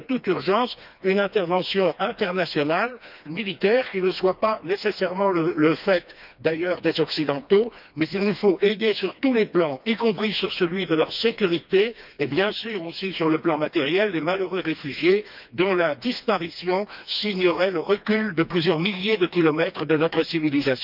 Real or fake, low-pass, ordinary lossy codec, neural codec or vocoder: fake; 5.4 kHz; none; codec, 16 kHz, 2 kbps, FreqCodec, smaller model